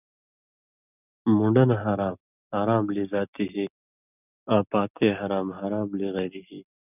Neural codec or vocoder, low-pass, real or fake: none; 3.6 kHz; real